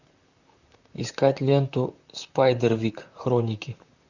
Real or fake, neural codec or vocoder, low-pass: fake; vocoder, 44.1 kHz, 128 mel bands, Pupu-Vocoder; 7.2 kHz